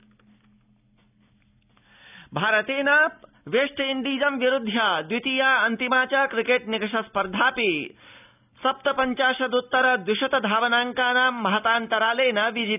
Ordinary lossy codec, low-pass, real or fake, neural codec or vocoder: none; 3.6 kHz; real; none